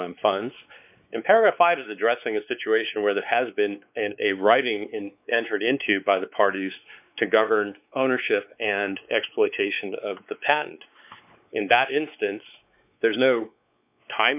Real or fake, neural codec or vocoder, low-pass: fake; codec, 16 kHz, 4 kbps, X-Codec, WavLM features, trained on Multilingual LibriSpeech; 3.6 kHz